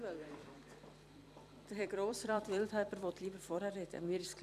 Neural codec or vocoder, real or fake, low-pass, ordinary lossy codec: vocoder, 44.1 kHz, 128 mel bands every 256 samples, BigVGAN v2; fake; 14.4 kHz; none